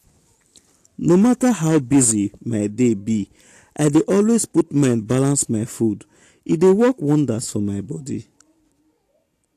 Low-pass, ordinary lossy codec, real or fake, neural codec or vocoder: 14.4 kHz; AAC, 64 kbps; real; none